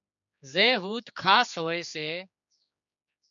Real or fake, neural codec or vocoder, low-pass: fake; codec, 16 kHz, 4 kbps, X-Codec, HuBERT features, trained on general audio; 7.2 kHz